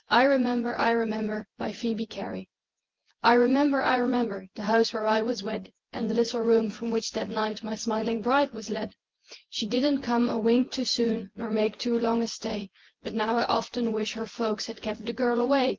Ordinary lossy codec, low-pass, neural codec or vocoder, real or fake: Opus, 16 kbps; 7.2 kHz; vocoder, 24 kHz, 100 mel bands, Vocos; fake